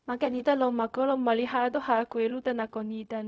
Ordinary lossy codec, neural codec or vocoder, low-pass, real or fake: none; codec, 16 kHz, 0.4 kbps, LongCat-Audio-Codec; none; fake